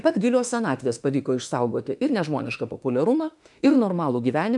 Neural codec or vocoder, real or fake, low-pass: autoencoder, 48 kHz, 32 numbers a frame, DAC-VAE, trained on Japanese speech; fake; 10.8 kHz